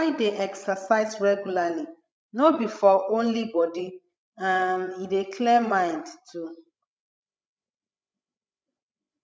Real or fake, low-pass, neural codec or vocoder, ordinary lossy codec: fake; none; codec, 16 kHz, 16 kbps, FreqCodec, larger model; none